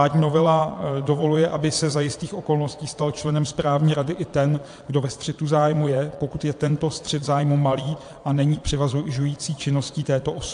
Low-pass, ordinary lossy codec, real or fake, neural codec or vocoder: 9.9 kHz; AAC, 64 kbps; fake; vocoder, 22.05 kHz, 80 mel bands, Vocos